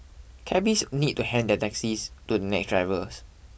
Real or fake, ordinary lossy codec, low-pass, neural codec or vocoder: real; none; none; none